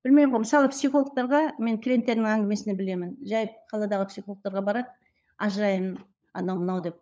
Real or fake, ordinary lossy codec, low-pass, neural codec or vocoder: fake; none; none; codec, 16 kHz, 16 kbps, FunCodec, trained on LibriTTS, 50 frames a second